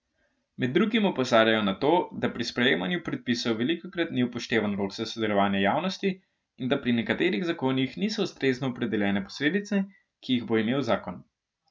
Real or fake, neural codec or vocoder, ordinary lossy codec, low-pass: real; none; none; none